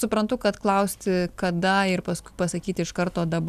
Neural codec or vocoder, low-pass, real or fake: none; 14.4 kHz; real